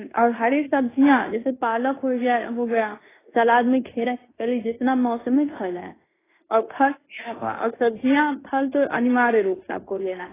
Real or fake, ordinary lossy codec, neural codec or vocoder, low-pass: fake; AAC, 16 kbps; codec, 16 kHz in and 24 kHz out, 0.9 kbps, LongCat-Audio-Codec, fine tuned four codebook decoder; 3.6 kHz